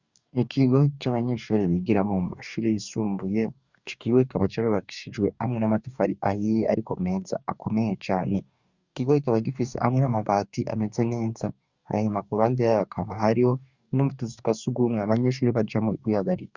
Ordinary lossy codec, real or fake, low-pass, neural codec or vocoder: Opus, 64 kbps; fake; 7.2 kHz; codec, 44.1 kHz, 2.6 kbps, DAC